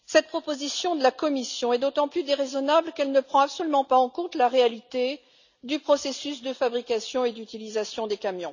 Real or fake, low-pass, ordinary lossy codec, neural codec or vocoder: real; 7.2 kHz; none; none